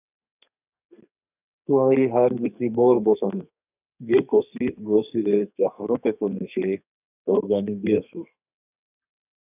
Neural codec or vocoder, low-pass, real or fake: codec, 32 kHz, 1.9 kbps, SNAC; 3.6 kHz; fake